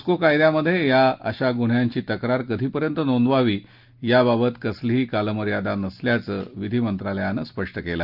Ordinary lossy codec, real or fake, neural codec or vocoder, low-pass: Opus, 32 kbps; real; none; 5.4 kHz